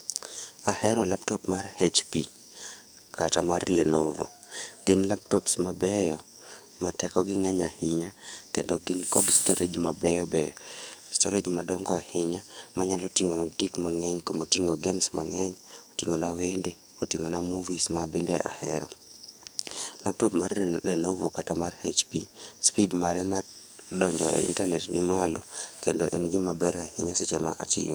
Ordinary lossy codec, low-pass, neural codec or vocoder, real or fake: none; none; codec, 44.1 kHz, 2.6 kbps, SNAC; fake